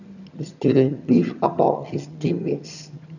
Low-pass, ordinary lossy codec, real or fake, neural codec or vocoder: 7.2 kHz; none; fake; vocoder, 22.05 kHz, 80 mel bands, HiFi-GAN